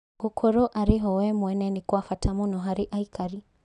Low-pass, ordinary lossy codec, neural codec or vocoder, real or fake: 10.8 kHz; none; none; real